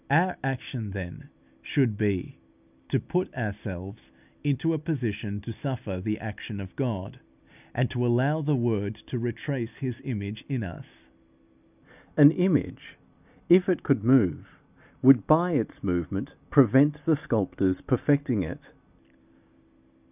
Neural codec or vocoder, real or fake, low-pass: none; real; 3.6 kHz